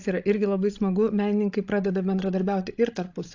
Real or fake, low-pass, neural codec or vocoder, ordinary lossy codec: fake; 7.2 kHz; codec, 16 kHz, 16 kbps, FreqCodec, larger model; AAC, 48 kbps